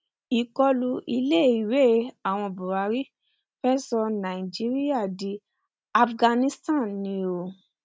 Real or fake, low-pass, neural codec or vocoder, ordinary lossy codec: real; none; none; none